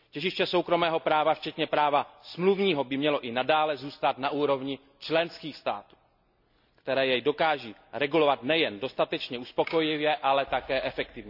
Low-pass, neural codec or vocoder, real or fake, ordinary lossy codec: 5.4 kHz; none; real; none